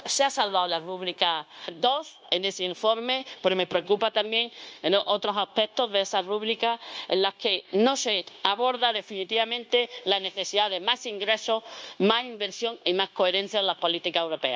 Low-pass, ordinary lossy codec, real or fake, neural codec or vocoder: none; none; fake; codec, 16 kHz, 0.9 kbps, LongCat-Audio-Codec